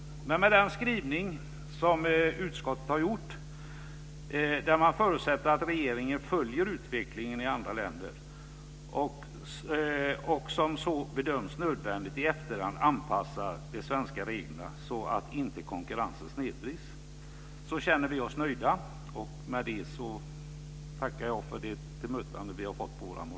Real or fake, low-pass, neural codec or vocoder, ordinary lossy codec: real; none; none; none